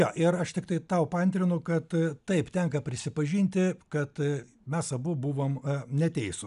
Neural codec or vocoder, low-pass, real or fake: none; 10.8 kHz; real